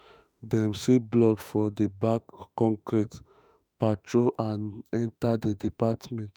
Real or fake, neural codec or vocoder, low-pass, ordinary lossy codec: fake; autoencoder, 48 kHz, 32 numbers a frame, DAC-VAE, trained on Japanese speech; none; none